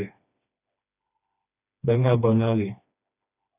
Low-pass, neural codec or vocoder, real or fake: 3.6 kHz; codec, 16 kHz, 2 kbps, FreqCodec, smaller model; fake